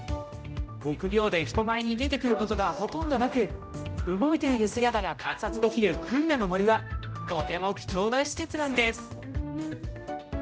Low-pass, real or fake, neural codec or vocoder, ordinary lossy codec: none; fake; codec, 16 kHz, 0.5 kbps, X-Codec, HuBERT features, trained on general audio; none